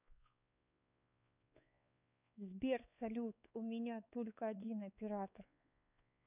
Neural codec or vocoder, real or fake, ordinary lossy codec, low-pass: codec, 16 kHz, 4 kbps, X-Codec, WavLM features, trained on Multilingual LibriSpeech; fake; none; 3.6 kHz